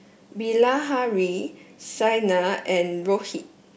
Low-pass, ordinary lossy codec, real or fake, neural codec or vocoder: none; none; real; none